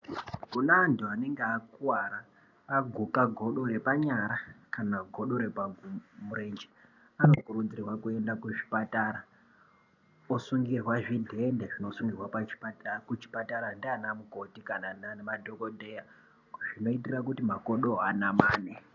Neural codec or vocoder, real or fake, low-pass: none; real; 7.2 kHz